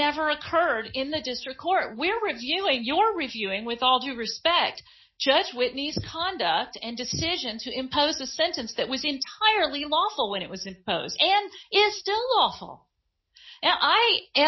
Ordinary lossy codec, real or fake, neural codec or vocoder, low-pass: MP3, 24 kbps; real; none; 7.2 kHz